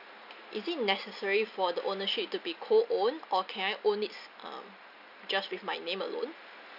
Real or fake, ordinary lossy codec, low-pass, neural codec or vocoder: real; none; 5.4 kHz; none